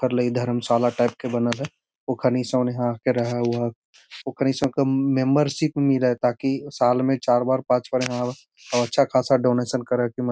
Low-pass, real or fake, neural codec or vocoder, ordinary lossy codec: none; real; none; none